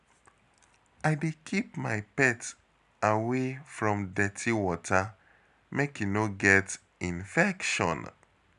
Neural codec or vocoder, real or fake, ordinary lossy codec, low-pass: none; real; none; 10.8 kHz